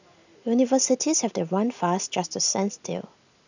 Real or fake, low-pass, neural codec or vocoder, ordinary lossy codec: real; 7.2 kHz; none; none